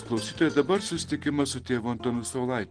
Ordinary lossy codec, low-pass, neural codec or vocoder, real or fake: Opus, 16 kbps; 9.9 kHz; none; real